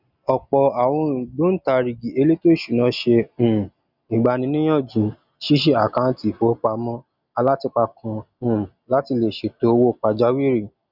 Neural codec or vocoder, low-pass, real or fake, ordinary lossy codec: none; 5.4 kHz; real; none